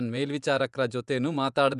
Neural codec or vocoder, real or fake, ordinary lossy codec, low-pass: vocoder, 24 kHz, 100 mel bands, Vocos; fake; none; 10.8 kHz